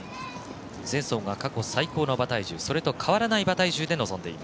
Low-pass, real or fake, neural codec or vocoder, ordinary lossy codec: none; real; none; none